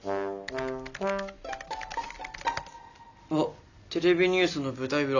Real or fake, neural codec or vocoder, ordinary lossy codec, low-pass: real; none; none; 7.2 kHz